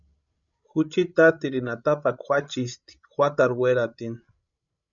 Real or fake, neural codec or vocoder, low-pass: fake; codec, 16 kHz, 16 kbps, FreqCodec, larger model; 7.2 kHz